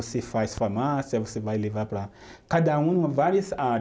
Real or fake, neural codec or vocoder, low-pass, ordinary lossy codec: real; none; none; none